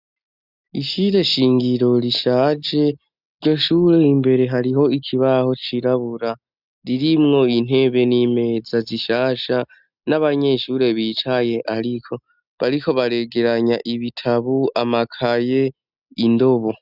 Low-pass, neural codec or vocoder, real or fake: 5.4 kHz; none; real